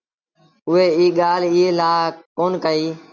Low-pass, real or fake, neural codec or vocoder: 7.2 kHz; real; none